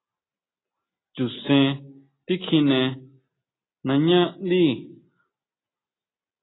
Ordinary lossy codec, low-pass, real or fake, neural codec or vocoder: AAC, 16 kbps; 7.2 kHz; real; none